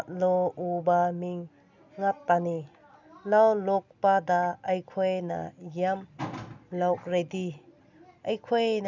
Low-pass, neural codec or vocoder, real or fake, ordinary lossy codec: 7.2 kHz; none; real; none